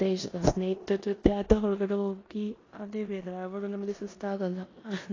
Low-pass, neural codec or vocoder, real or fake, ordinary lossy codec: 7.2 kHz; codec, 16 kHz in and 24 kHz out, 0.9 kbps, LongCat-Audio-Codec, four codebook decoder; fake; AAC, 32 kbps